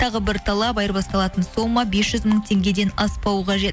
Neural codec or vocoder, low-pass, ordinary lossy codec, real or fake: none; none; none; real